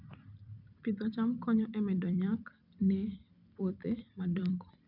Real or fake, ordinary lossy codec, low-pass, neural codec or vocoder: fake; none; 5.4 kHz; vocoder, 44.1 kHz, 128 mel bands every 256 samples, BigVGAN v2